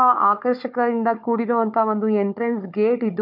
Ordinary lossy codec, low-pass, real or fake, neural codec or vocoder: none; 5.4 kHz; fake; codec, 16 kHz, 4 kbps, FunCodec, trained on Chinese and English, 50 frames a second